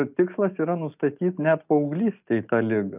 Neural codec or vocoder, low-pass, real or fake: none; 3.6 kHz; real